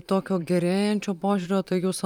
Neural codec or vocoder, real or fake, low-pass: vocoder, 44.1 kHz, 128 mel bands every 512 samples, BigVGAN v2; fake; 19.8 kHz